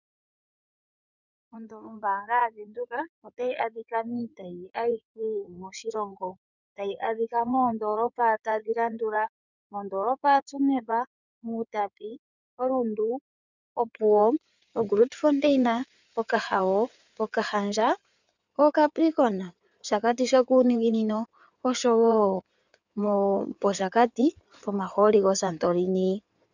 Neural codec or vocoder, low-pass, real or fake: codec, 16 kHz in and 24 kHz out, 2.2 kbps, FireRedTTS-2 codec; 7.2 kHz; fake